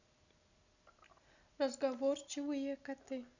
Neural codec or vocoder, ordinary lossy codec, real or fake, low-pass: none; none; real; 7.2 kHz